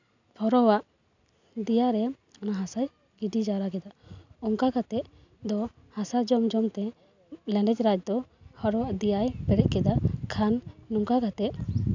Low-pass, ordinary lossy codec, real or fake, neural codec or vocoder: 7.2 kHz; none; real; none